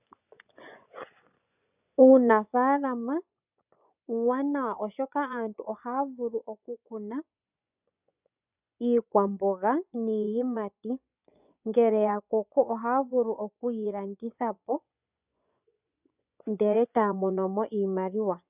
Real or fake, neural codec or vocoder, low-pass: fake; vocoder, 24 kHz, 100 mel bands, Vocos; 3.6 kHz